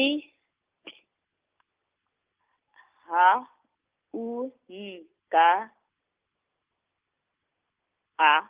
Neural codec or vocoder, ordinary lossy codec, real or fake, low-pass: none; Opus, 32 kbps; real; 3.6 kHz